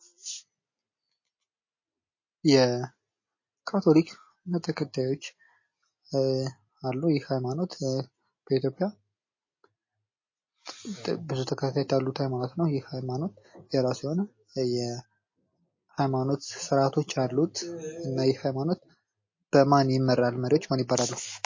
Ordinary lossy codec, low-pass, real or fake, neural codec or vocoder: MP3, 32 kbps; 7.2 kHz; real; none